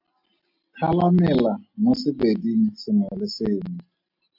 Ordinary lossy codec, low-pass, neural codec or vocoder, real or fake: MP3, 48 kbps; 5.4 kHz; none; real